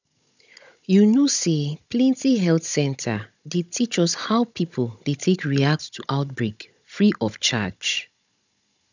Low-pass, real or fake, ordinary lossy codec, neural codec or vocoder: 7.2 kHz; fake; none; codec, 16 kHz, 16 kbps, FunCodec, trained on Chinese and English, 50 frames a second